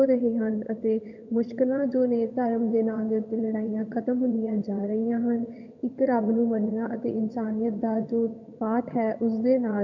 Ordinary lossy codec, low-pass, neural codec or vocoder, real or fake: none; 7.2 kHz; vocoder, 22.05 kHz, 80 mel bands, HiFi-GAN; fake